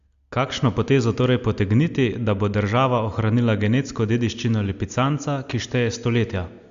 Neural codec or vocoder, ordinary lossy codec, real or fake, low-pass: none; Opus, 64 kbps; real; 7.2 kHz